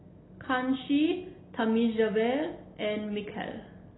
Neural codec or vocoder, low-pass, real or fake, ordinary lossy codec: none; 7.2 kHz; real; AAC, 16 kbps